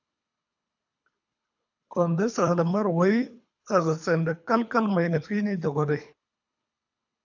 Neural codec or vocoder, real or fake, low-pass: codec, 24 kHz, 3 kbps, HILCodec; fake; 7.2 kHz